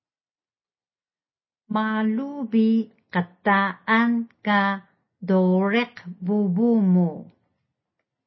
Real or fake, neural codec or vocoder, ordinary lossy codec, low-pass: real; none; MP3, 24 kbps; 7.2 kHz